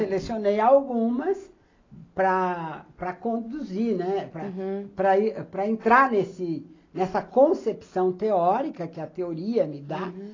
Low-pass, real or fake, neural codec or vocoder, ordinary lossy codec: 7.2 kHz; real; none; AAC, 32 kbps